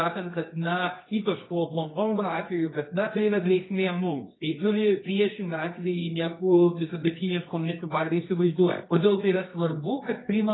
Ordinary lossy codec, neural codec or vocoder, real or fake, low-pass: AAC, 16 kbps; codec, 24 kHz, 0.9 kbps, WavTokenizer, medium music audio release; fake; 7.2 kHz